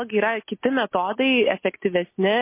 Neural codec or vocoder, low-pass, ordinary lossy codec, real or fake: none; 3.6 kHz; MP3, 24 kbps; real